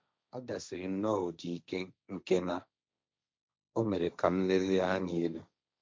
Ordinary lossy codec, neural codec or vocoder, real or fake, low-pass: none; codec, 16 kHz, 1.1 kbps, Voila-Tokenizer; fake; none